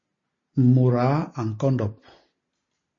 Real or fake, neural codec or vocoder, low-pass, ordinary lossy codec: real; none; 7.2 kHz; MP3, 32 kbps